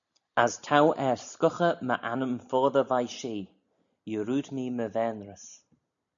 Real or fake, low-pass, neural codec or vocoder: real; 7.2 kHz; none